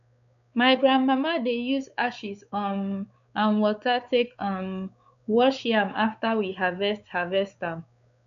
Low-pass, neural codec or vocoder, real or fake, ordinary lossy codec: 7.2 kHz; codec, 16 kHz, 4 kbps, X-Codec, WavLM features, trained on Multilingual LibriSpeech; fake; MP3, 64 kbps